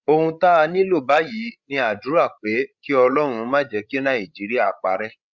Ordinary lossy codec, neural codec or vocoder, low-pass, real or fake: Opus, 64 kbps; codec, 16 kHz, 16 kbps, FreqCodec, larger model; 7.2 kHz; fake